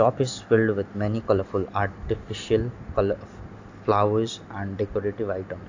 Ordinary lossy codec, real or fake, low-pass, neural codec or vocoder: AAC, 48 kbps; real; 7.2 kHz; none